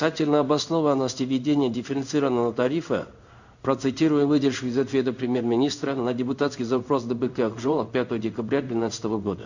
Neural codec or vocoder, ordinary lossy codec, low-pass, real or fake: codec, 16 kHz in and 24 kHz out, 1 kbps, XY-Tokenizer; none; 7.2 kHz; fake